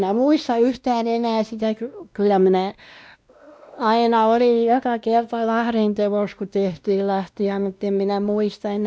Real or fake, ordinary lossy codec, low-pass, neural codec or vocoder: fake; none; none; codec, 16 kHz, 1 kbps, X-Codec, WavLM features, trained on Multilingual LibriSpeech